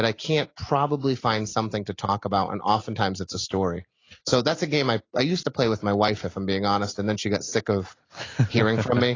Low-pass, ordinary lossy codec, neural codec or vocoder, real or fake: 7.2 kHz; AAC, 32 kbps; none; real